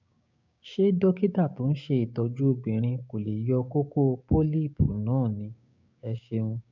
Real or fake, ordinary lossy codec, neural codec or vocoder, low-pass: fake; MP3, 64 kbps; codec, 16 kHz, 6 kbps, DAC; 7.2 kHz